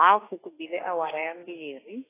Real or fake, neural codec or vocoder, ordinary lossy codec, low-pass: fake; autoencoder, 48 kHz, 32 numbers a frame, DAC-VAE, trained on Japanese speech; AAC, 16 kbps; 3.6 kHz